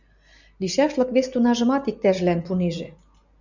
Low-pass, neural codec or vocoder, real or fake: 7.2 kHz; none; real